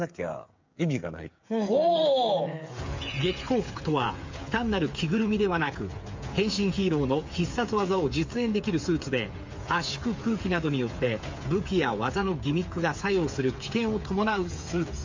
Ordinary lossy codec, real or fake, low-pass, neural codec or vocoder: MP3, 48 kbps; fake; 7.2 kHz; codec, 16 kHz, 8 kbps, FreqCodec, smaller model